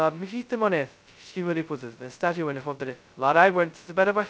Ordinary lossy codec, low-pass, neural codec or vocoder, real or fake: none; none; codec, 16 kHz, 0.2 kbps, FocalCodec; fake